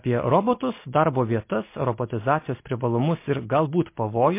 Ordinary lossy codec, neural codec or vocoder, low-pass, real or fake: MP3, 24 kbps; none; 3.6 kHz; real